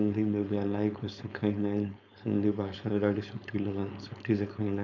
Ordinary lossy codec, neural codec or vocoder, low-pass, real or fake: none; codec, 16 kHz, 4.8 kbps, FACodec; 7.2 kHz; fake